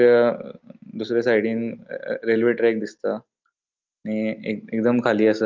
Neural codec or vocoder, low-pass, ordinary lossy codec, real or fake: none; 7.2 kHz; Opus, 32 kbps; real